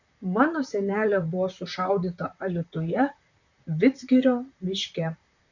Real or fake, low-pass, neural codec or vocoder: fake; 7.2 kHz; vocoder, 44.1 kHz, 128 mel bands, Pupu-Vocoder